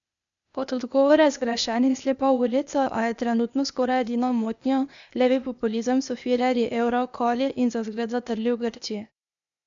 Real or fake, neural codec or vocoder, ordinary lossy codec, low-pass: fake; codec, 16 kHz, 0.8 kbps, ZipCodec; none; 7.2 kHz